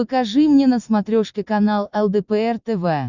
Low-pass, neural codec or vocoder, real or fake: 7.2 kHz; none; real